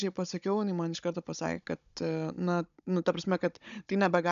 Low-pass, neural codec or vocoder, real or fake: 7.2 kHz; codec, 16 kHz, 16 kbps, FunCodec, trained on Chinese and English, 50 frames a second; fake